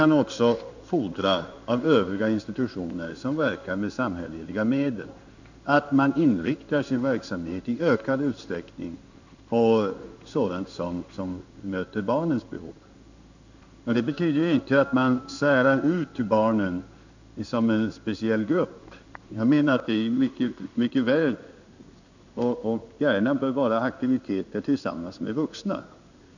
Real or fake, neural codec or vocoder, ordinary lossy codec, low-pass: fake; codec, 16 kHz in and 24 kHz out, 1 kbps, XY-Tokenizer; none; 7.2 kHz